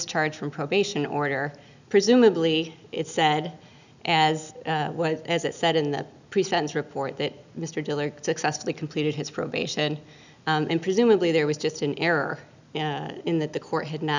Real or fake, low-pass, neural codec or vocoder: real; 7.2 kHz; none